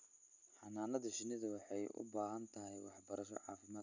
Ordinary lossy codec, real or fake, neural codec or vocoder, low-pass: none; real; none; 7.2 kHz